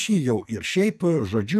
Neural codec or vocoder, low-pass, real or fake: codec, 44.1 kHz, 2.6 kbps, SNAC; 14.4 kHz; fake